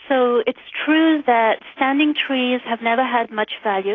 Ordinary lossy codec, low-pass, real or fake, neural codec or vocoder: AAC, 32 kbps; 7.2 kHz; real; none